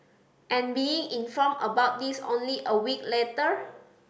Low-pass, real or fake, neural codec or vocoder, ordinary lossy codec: none; real; none; none